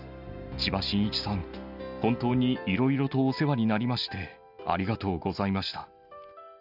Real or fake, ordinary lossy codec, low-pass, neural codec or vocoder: real; none; 5.4 kHz; none